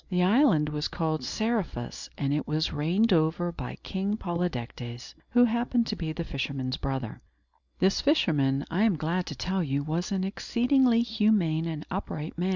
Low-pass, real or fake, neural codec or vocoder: 7.2 kHz; real; none